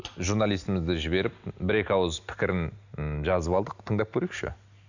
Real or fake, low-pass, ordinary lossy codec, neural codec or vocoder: real; 7.2 kHz; none; none